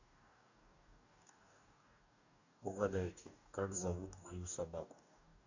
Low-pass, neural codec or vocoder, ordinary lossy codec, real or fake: 7.2 kHz; codec, 44.1 kHz, 2.6 kbps, DAC; none; fake